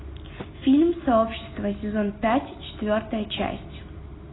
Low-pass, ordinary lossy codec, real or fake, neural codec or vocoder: 7.2 kHz; AAC, 16 kbps; real; none